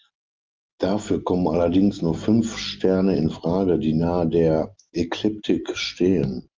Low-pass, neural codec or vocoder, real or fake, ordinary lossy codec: 7.2 kHz; none; real; Opus, 24 kbps